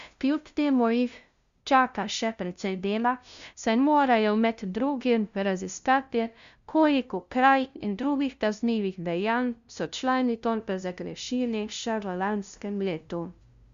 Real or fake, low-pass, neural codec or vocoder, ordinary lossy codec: fake; 7.2 kHz; codec, 16 kHz, 0.5 kbps, FunCodec, trained on LibriTTS, 25 frames a second; Opus, 64 kbps